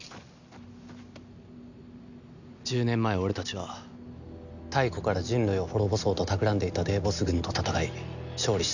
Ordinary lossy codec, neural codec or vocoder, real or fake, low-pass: none; none; real; 7.2 kHz